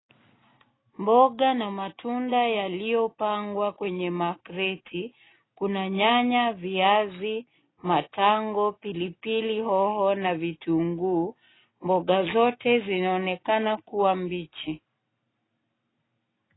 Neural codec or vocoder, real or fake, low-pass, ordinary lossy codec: none; real; 7.2 kHz; AAC, 16 kbps